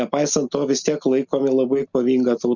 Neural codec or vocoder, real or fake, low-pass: none; real; 7.2 kHz